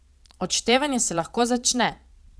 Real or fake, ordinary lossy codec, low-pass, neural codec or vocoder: fake; none; none; vocoder, 22.05 kHz, 80 mel bands, Vocos